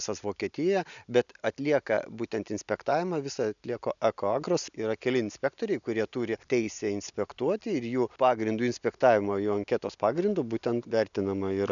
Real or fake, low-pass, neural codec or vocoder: real; 7.2 kHz; none